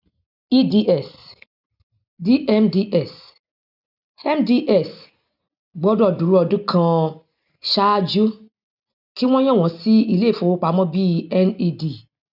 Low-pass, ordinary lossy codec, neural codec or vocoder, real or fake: 5.4 kHz; none; none; real